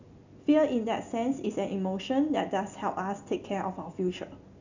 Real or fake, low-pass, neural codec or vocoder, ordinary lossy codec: real; 7.2 kHz; none; none